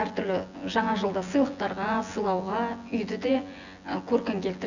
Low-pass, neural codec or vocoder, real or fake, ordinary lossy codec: 7.2 kHz; vocoder, 24 kHz, 100 mel bands, Vocos; fake; none